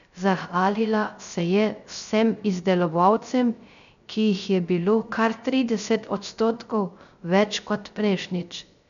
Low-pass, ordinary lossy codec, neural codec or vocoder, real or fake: 7.2 kHz; none; codec, 16 kHz, 0.3 kbps, FocalCodec; fake